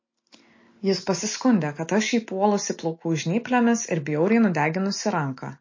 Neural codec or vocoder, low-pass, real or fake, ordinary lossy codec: none; 7.2 kHz; real; MP3, 32 kbps